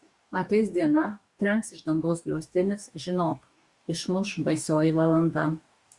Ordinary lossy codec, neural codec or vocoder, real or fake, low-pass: Opus, 64 kbps; codec, 44.1 kHz, 2.6 kbps, DAC; fake; 10.8 kHz